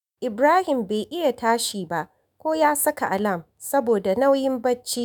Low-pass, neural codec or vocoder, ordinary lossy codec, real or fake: none; autoencoder, 48 kHz, 128 numbers a frame, DAC-VAE, trained on Japanese speech; none; fake